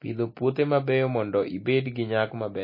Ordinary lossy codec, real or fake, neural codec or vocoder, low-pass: MP3, 24 kbps; real; none; 5.4 kHz